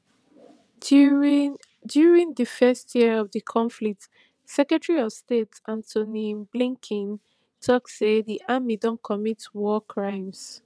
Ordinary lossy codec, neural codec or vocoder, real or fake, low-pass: none; vocoder, 22.05 kHz, 80 mel bands, WaveNeXt; fake; none